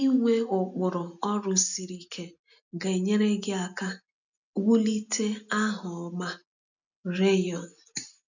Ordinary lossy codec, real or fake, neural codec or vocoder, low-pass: none; real; none; 7.2 kHz